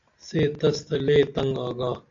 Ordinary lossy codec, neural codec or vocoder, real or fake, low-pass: AAC, 48 kbps; none; real; 7.2 kHz